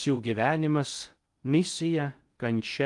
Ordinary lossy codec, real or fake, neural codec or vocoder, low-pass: Opus, 32 kbps; fake; codec, 16 kHz in and 24 kHz out, 0.6 kbps, FocalCodec, streaming, 2048 codes; 10.8 kHz